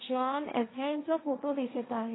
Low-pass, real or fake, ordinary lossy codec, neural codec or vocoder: 7.2 kHz; fake; AAC, 16 kbps; codec, 16 kHz, 1.1 kbps, Voila-Tokenizer